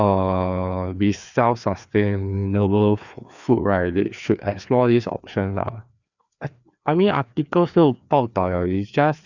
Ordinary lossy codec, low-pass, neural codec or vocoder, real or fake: none; 7.2 kHz; codec, 16 kHz, 2 kbps, FreqCodec, larger model; fake